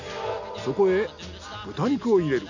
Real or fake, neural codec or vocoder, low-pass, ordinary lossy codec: real; none; 7.2 kHz; none